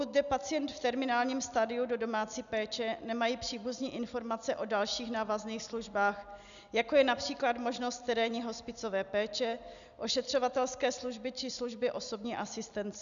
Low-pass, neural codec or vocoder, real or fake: 7.2 kHz; none; real